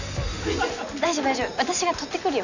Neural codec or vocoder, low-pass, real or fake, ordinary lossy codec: none; 7.2 kHz; real; none